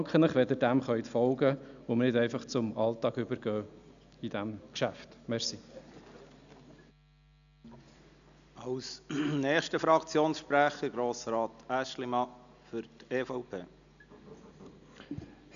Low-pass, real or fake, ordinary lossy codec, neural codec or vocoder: 7.2 kHz; real; none; none